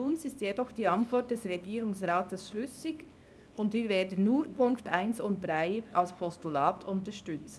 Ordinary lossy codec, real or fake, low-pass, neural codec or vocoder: none; fake; none; codec, 24 kHz, 0.9 kbps, WavTokenizer, small release